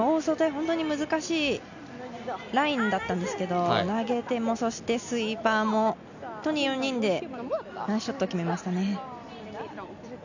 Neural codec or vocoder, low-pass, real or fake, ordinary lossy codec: none; 7.2 kHz; real; none